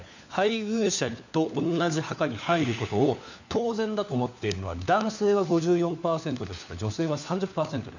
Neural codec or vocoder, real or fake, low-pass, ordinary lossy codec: codec, 16 kHz, 4 kbps, FunCodec, trained on LibriTTS, 50 frames a second; fake; 7.2 kHz; none